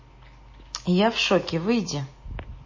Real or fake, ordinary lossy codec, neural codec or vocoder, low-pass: real; MP3, 32 kbps; none; 7.2 kHz